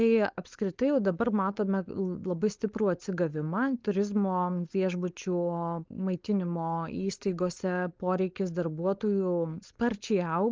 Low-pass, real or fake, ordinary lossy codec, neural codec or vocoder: 7.2 kHz; fake; Opus, 24 kbps; codec, 16 kHz, 4.8 kbps, FACodec